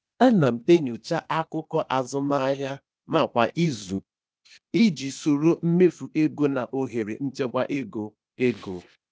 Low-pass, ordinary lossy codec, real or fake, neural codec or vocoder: none; none; fake; codec, 16 kHz, 0.8 kbps, ZipCodec